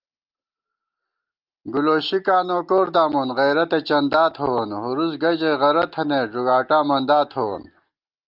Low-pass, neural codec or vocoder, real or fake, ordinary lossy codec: 5.4 kHz; none; real; Opus, 24 kbps